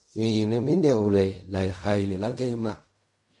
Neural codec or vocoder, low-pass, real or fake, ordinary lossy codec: codec, 16 kHz in and 24 kHz out, 0.4 kbps, LongCat-Audio-Codec, fine tuned four codebook decoder; 10.8 kHz; fake; MP3, 48 kbps